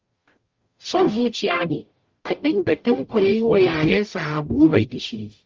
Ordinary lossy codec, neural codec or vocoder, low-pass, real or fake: Opus, 32 kbps; codec, 44.1 kHz, 0.9 kbps, DAC; 7.2 kHz; fake